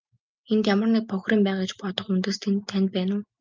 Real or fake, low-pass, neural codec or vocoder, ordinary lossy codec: real; 7.2 kHz; none; Opus, 24 kbps